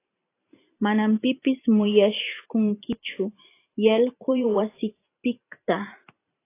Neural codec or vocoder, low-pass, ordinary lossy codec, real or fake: vocoder, 44.1 kHz, 128 mel bands every 512 samples, BigVGAN v2; 3.6 kHz; AAC, 24 kbps; fake